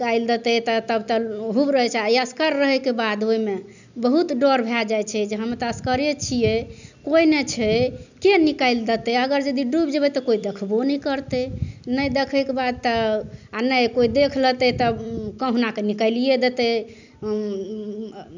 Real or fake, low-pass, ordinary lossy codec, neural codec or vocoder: real; 7.2 kHz; none; none